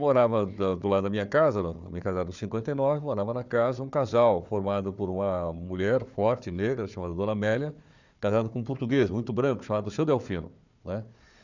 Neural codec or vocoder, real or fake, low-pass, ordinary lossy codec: codec, 16 kHz, 4 kbps, FunCodec, trained on Chinese and English, 50 frames a second; fake; 7.2 kHz; none